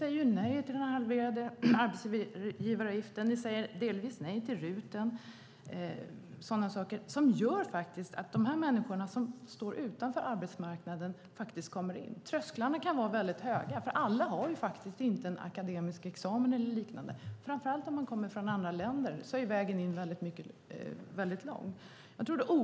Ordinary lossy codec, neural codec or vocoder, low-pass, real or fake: none; none; none; real